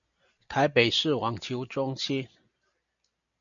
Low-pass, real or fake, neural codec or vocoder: 7.2 kHz; real; none